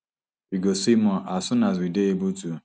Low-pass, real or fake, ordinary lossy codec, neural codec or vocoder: none; real; none; none